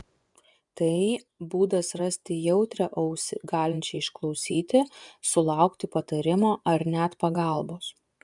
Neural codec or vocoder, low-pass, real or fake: vocoder, 24 kHz, 100 mel bands, Vocos; 10.8 kHz; fake